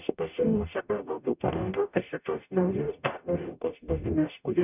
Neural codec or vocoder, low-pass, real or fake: codec, 44.1 kHz, 0.9 kbps, DAC; 3.6 kHz; fake